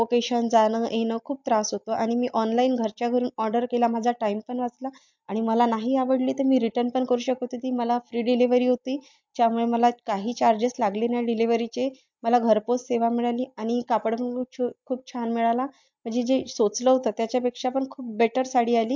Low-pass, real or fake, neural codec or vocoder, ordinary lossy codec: 7.2 kHz; real; none; MP3, 64 kbps